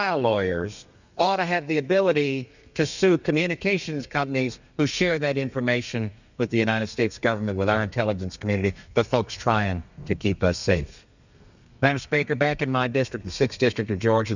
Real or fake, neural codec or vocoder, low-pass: fake; codec, 32 kHz, 1.9 kbps, SNAC; 7.2 kHz